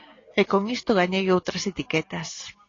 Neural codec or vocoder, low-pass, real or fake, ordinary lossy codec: none; 7.2 kHz; real; AAC, 32 kbps